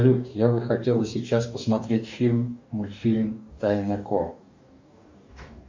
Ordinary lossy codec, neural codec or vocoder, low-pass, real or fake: MP3, 48 kbps; codec, 44.1 kHz, 2.6 kbps, DAC; 7.2 kHz; fake